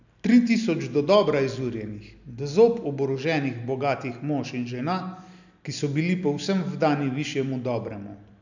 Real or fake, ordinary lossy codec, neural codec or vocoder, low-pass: real; none; none; 7.2 kHz